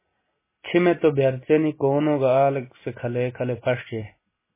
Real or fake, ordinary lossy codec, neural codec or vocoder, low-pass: real; MP3, 16 kbps; none; 3.6 kHz